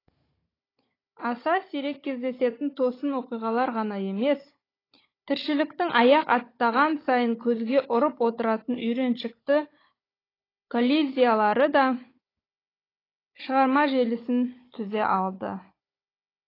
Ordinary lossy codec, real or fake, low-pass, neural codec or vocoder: AAC, 24 kbps; fake; 5.4 kHz; codec, 16 kHz, 16 kbps, FunCodec, trained on Chinese and English, 50 frames a second